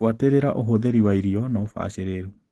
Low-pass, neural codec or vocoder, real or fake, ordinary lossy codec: 10.8 kHz; none; real; Opus, 16 kbps